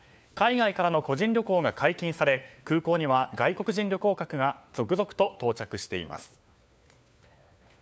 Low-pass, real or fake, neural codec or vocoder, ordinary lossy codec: none; fake; codec, 16 kHz, 4 kbps, FunCodec, trained on LibriTTS, 50 frames a second; none